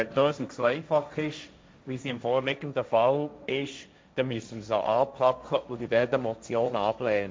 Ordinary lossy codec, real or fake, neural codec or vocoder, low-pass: none; fake; codec, 16 kHz, 1.1 kbps, Voila-Tokenizer; none